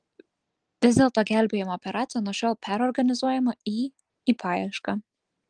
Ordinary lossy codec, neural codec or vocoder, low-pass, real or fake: Opus, 24 kbps; none; 9.9 kHz; real